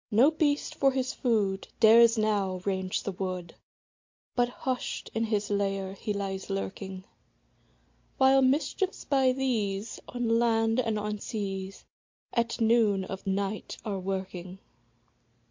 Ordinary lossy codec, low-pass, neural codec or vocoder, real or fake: MP3, 48 kbps; 7.2 kHz; none; real